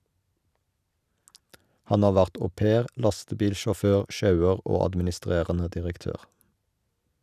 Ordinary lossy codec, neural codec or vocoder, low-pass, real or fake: none; none; 14.4 kHz; real